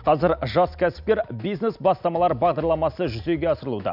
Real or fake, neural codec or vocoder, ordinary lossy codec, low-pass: real; none; none; 5.4 kHz